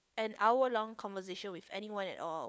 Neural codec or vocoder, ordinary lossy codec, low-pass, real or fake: codec, 16 kHz, 4 kbps, FunCodec, trained on LibriTTS, 50 frames a second; none; none; fake